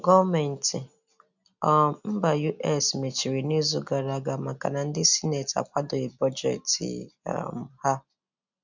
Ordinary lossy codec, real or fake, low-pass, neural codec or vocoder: none; real; 7.2 kHz; none